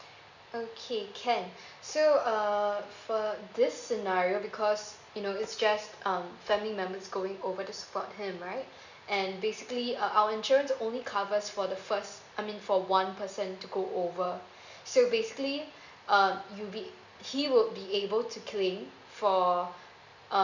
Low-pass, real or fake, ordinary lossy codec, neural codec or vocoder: 7.2 kHz; real; none; none